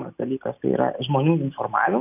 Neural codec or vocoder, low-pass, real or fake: none; 3.6 kHz; real